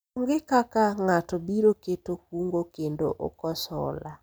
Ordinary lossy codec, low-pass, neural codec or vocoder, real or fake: none; none; none; real